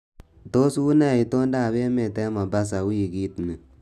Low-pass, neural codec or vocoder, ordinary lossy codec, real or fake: 14.4 kHz; none; none; real